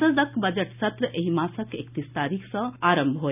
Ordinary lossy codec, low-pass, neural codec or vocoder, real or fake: none; 3.6 kHz; none; real